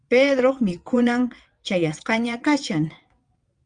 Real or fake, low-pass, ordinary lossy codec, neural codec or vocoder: fake; 9.9 kHz; Opus, 32 kbps; vocoder, 22.05 kHz, 80 mel bands, WaveNeXt